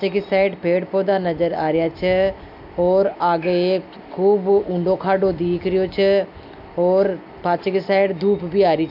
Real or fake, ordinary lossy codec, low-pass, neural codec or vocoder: real; AAC, 48 kbps; 5.4 kHz; none